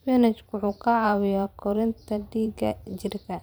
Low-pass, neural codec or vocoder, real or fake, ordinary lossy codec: none; vocoder, 44.1 kHz, 128 mel bands every 512 samples, BigVGAN v2; fake; none